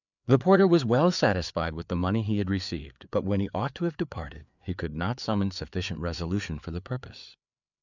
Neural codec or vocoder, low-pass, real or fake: codec, 16 kHz, 4 kbps, FreqCodec, larger model; 7.2 kHz; fake